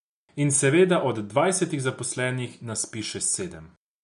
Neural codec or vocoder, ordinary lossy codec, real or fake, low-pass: none; none; real; 10.8 kHz